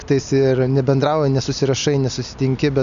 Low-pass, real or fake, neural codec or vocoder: 7.2 kHz; real; none